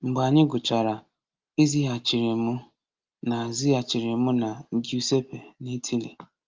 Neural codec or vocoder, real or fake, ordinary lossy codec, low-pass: none; real; Opus, 32 kbps; 7.2 kHz